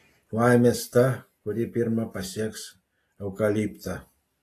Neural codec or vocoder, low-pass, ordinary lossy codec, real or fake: none; 14.4 kHz; AAC, 48 kbps; real